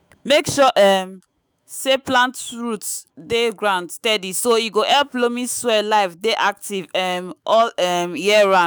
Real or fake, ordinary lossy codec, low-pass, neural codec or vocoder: fake; none; none; autoencoder, 48 kHz, 128 numbers a frame, DAC-VAE, trained on Japanese speech